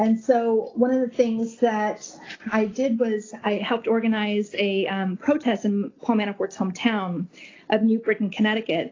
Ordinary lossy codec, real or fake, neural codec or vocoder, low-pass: AAC, 32 kbps; real; none; 7.2 kHz